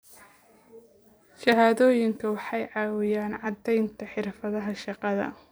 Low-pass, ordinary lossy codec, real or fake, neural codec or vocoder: none; none; fake; vocoder, 44.1 kHz, 128 mel bands every 256 samples, BigVGAN v2